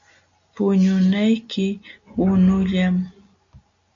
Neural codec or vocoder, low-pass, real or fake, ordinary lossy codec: none; 7.2 kHz; real; MP3, 64 kbps